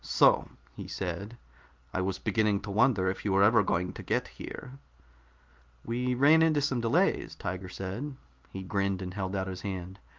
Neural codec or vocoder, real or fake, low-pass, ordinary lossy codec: none; real; 7.2 kHz; Opus, 32 kbps